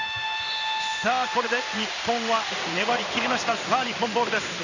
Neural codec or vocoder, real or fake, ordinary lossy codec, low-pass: codec, 16 kHz in and 24 kHz out, 1 kbps, XY-Tokenizer; fake; none; 7.2 kHz